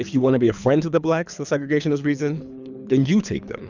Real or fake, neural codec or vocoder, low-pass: fake; codec, 24 kHz, 3 kbps, HILCodec; 7.2 kHz